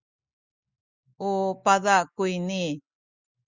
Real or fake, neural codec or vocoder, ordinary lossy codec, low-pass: real; none; Opus, 64 kbps; 7.2 kHz